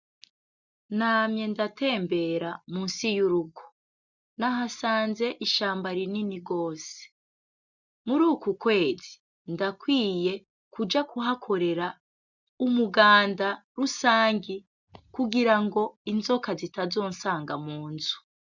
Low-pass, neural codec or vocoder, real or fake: 7.2 kHz; none; real